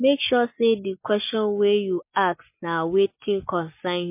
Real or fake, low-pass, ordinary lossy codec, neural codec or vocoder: real; 3.6 kHz; MP3, 24 kbps; none